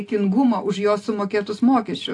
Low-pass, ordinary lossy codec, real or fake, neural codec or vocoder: 10.8 kHz; AAC, 48 kbps; real; none